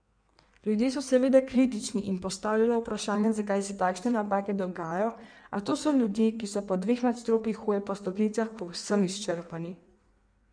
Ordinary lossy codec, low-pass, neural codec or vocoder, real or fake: none; 9.9 kHz; codec, 16 kHz in and 24 kHz out, 1.1 kbps, FireRedTTS-2 codec; fake